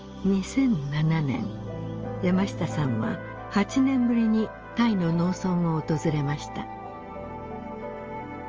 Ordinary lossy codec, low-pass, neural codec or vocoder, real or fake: Opus, 24 kbps; 7.2 kHz; none; real